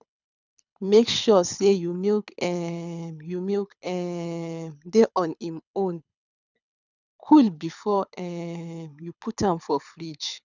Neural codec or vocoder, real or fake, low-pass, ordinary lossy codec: codec, 24 kHz, 6 kbps, HILCodec; fake; 7.2 kHz; none